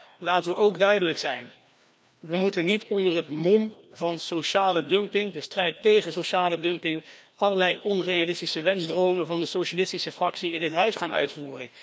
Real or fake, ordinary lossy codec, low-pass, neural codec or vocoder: fake; none; none; codec, 16 kHz, 1 kbps, FreqCodec, larger model